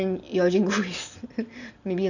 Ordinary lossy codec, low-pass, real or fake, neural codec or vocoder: none; 7.2 kHz; real; none